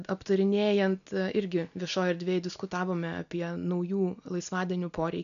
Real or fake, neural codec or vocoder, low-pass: real; none; 7.2 kHz